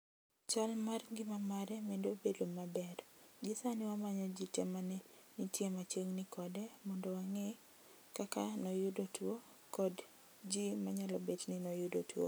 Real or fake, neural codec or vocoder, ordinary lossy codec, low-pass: real; none; none; none